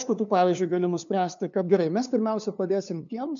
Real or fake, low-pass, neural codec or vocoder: fake; 7.2 kHz; codec, 16 kHz, 2 kbps, FunCodec, trained on LibriTTS, 25 frames a second